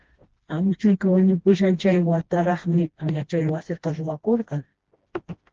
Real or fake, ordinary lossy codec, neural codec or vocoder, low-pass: fake; Opus, 16 kbps; codec, 16 kHz, 1 kbps, FreqCodec, smaller model; 7.2 kHz